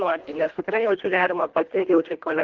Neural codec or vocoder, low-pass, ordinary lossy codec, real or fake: codec, 24 kHz, 1.5 kbps, HILCodec; 7.2 kHz; Opus, 16 kbps; fake